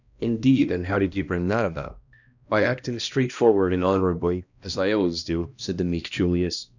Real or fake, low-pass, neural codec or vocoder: fake; 7.2 kHz; codec, 16 kHz, 1 kbps, X-Codec, HuBERT features, trained on balanced general audio